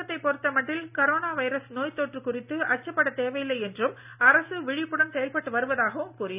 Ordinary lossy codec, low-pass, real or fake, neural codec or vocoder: none; 3.6 kHz; real; none